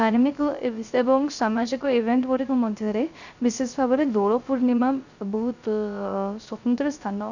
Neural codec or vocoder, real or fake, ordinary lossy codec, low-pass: codec, 16 kHz, 0.3 kbps, FocalCodec; fake; none; 7.2 kHz